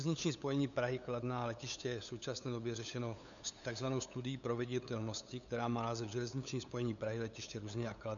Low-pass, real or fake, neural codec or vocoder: 7.2 kHz; fake; codec, 16 kHz, 8 kbps, FunCodec, trained on LibriTTS, 25 frames a second